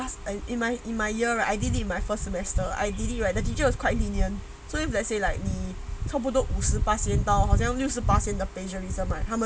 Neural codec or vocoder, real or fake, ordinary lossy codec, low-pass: none; real; none; none